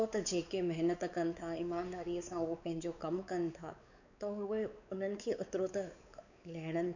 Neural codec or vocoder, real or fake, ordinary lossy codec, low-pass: codec, 16 kHz, 4 kbps, X-Codec, WavLM features, trained on Multilingual LibriSpeech; fake; none; 7.2 kHz